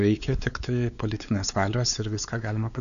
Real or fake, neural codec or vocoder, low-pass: fake; codec, 16 kHz, 4 kbps, X-Codec, WavLM features, trained on Multilingual LibriSpeech; 7.2 kHz